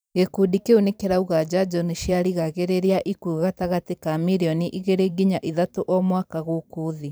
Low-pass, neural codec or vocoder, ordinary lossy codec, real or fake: none; vocoder, 44.1 kHz, 128 mel bands every 512 samples, BigVGAN v2; none; fake